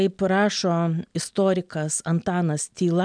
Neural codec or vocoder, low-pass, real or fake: none; 9.9 kHz; real